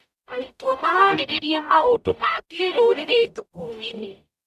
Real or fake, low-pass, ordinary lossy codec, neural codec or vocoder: fake; 14.4 kHz; none; codec, 44.1 kHz, 0.9 kbps, DAC